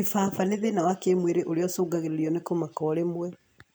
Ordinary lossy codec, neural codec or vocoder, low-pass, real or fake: none; none; none; real